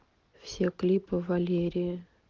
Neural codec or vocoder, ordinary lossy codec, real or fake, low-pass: none; Opus, 16 kbps; real; 7.2 kHz